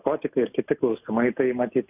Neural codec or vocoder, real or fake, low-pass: none; real; 3.6 kHz